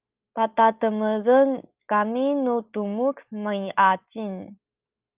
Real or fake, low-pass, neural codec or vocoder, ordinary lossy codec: real; 3.6 kHz; none; Opus, 24 kbps